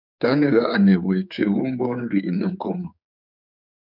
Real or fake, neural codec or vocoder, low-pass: fake; codec, 16 kHz, 4 kbps, X-Codec, HuBERT features, trained on general audio; 5.4 kHz